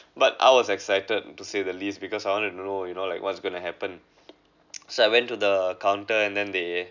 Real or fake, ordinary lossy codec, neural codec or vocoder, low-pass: real; none; none; 7.2 kHz